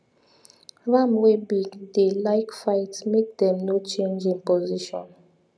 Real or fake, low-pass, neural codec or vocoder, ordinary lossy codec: real; none; none; none